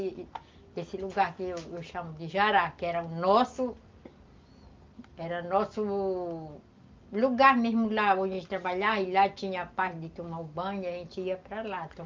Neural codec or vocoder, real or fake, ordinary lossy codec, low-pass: none; real; Opus, 24 kbps; 7.2 kHz